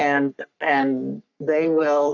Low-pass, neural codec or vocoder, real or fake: 7.2 kHz; codec, 44.1 kHz, 3.4 kbps, Pupu-Codec; fake